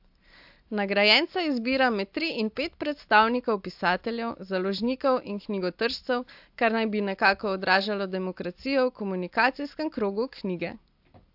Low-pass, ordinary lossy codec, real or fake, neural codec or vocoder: 5.4 kHz; AAC, 48 kbps; real; none